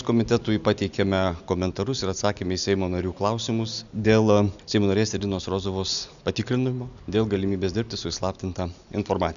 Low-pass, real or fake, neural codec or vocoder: 7.2 kHz; real; none